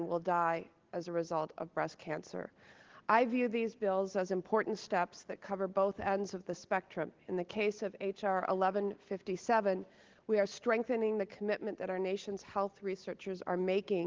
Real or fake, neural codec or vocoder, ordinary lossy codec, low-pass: real; none; Opus, 16 kbps; 7.2 kHz